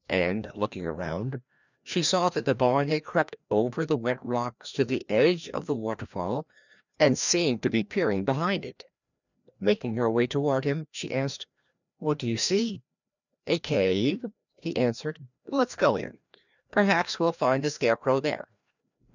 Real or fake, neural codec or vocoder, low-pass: fake; codec, 16 kHz, 1 kbps, FreqCodec, larger model; 7.2 kHz